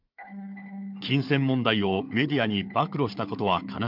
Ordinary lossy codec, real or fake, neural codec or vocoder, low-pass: MP3, 48 kbps; fake; codec, 16 kHz, 4 kbps, FunCodec, trained on Chinese and English, 50 frames a second; 5.4 kHz